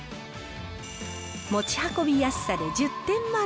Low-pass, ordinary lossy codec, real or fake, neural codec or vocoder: none; none; real; none